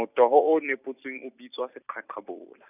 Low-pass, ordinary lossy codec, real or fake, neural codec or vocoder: 3.6 kHz; none; fake; vocoder, 44.1 kHz, 128 mel bands every 256 samples, BigVGAN v2